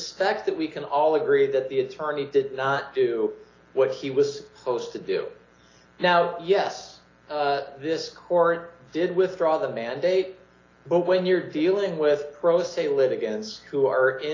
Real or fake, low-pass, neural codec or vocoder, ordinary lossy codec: real; 7.2 kHz; none; AAC, 32 kbps